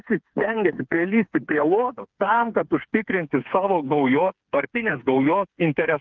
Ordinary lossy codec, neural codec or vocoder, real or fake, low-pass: Opus, 32 kbps; codec, 16 kHz, 4 kbps, FreqCodec, smaller model; fake; 7.2 kHz